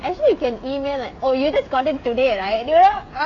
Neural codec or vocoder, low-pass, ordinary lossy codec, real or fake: codec, 16 kHz, 16 kbps, FreqCodec, smaller model; 7.2 kHz; AAC, 32 kbps; fake